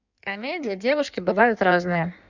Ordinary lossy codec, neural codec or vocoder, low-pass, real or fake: none; codec, 16 kHz in and 24 kHz out, 1.1 kbps, FireRedTTS-2 codec; 7.2 kHz; fake